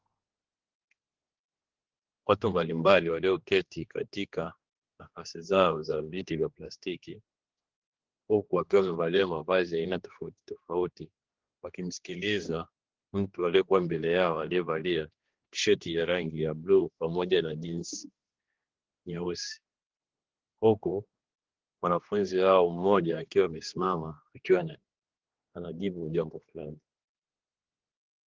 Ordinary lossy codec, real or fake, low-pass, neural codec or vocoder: Opus, 16 kbps; fake; 7.2 kHz; codec, 16 kHz, 2 kbps, X-Codec, HuBERT features, trained on general audio